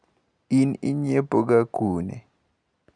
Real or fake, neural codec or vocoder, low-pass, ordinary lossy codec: real; none; 9.9 kHz; none